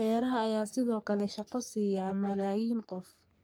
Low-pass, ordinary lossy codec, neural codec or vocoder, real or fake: none; none; codec, 44.1 kHz, 3.4 kbps, Pupu-Codec; fake